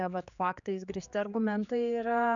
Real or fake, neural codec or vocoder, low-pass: fake; codec, 16 kHz, 4 kbps, X-Codec, HuBERT features, trained on general audio; 7.2 kHz